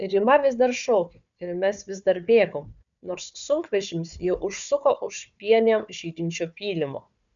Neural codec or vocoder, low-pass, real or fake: codec, 16 kHz, 4 kbps, FunCodec, trained on Chinese and English, 50 frames a second; 7.2 kHz; fake